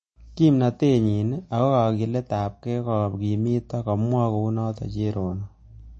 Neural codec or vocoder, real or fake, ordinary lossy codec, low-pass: none; real; MP3, 32 kbps; 10.8 kHz